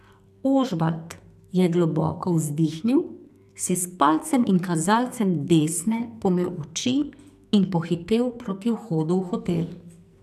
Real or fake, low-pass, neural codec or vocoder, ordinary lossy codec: fake; 14.4 kHz; codec, 44.1 kHz, 2.6 kbps, SNAC; none